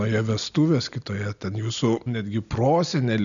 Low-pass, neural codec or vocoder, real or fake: 7.2 kHz; none; real